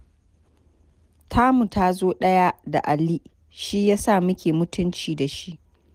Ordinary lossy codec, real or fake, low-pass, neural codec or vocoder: Opus, 24 kbps; real; 14.4 kHz; none